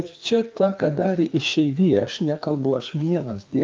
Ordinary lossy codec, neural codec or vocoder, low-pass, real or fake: Opus, 24 kbps; codec, 16 kHz, 2 kbps, FreqCodec, larger model; 7.2 kHz; fake